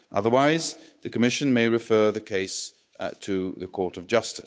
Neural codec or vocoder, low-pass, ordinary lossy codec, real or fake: codec, 16 kHz, 8 kbps, FunCodec, trained on Chinese and English, 25 frames a second; none; none; fake